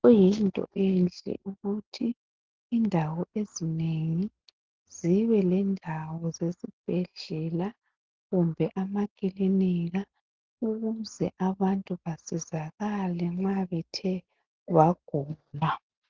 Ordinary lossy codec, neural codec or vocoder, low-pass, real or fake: Opus, 16 kbps; none; 7.2 kHz; real